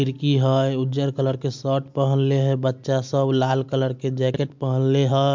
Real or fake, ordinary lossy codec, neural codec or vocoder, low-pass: real; MP3, 64 kbps; none; 7.2 kHz